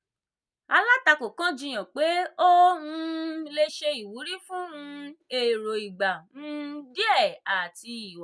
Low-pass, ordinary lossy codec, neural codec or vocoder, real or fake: none; none; none; real